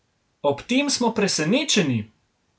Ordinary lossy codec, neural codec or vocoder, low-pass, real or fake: none; none; none; real